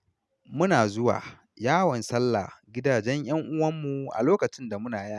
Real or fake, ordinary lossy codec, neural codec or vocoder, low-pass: real; none; none; none